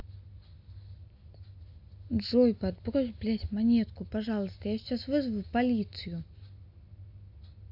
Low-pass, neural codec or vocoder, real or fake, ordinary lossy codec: 5.4 kHz; none; real; AAC, 48 kbps